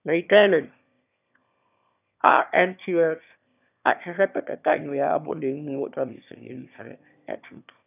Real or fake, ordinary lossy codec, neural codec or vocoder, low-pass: fake; none; autoencoder, 22.05 kHz, a latent of 192 numbers a frame, VITS, trained on one speaker; 3.6 kHz